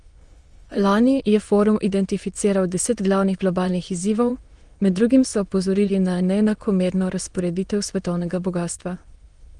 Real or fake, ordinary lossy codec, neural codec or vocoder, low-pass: fake; Opus, 24 kbps; autoencoder, 22.05 kHz, a latent of 192 numbers a frame, VITS, trained on many speakers; 9.9 kHz